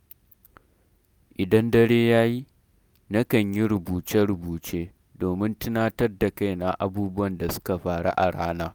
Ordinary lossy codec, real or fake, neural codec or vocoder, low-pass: none; real; none; none